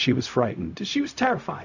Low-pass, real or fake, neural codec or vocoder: 7.2 kHz; fake; codec, 16 kHz, 0.4 kbps, LongCat-Audio-Codec